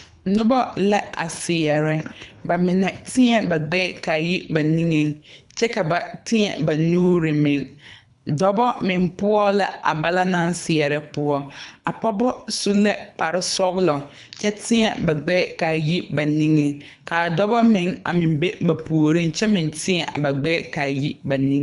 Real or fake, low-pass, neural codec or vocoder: fake; 10.8 kHz; codec, 24 kHz, 3 kbps, HILCodec